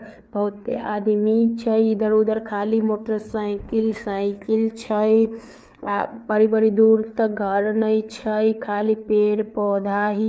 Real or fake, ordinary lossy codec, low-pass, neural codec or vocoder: fake; none; none; codec, 16 kHz, 4 kbps, FreqCodec, larger model